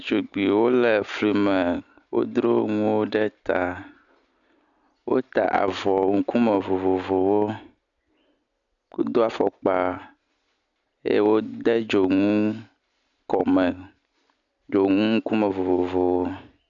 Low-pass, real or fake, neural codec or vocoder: 7.2 kHz; real; none